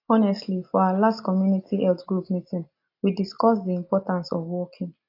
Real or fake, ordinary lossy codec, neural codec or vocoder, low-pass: real; AAC, 32 kbps; none; 5.4 kHz